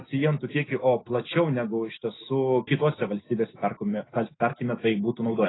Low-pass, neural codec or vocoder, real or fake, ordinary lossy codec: 7.2 kHz; none; real; AAC, 16 kbps